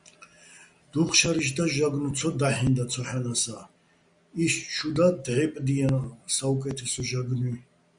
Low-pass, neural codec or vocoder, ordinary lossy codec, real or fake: 9.9 kHz; none; Opus, 64 kbps; real